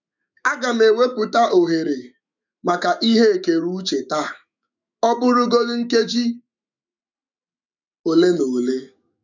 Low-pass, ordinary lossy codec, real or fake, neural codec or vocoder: 7.2 kHz; none; fake; autoencoder, 48 kHz, 128 numbers a frame, DAC-VAE, trained on Japanese speech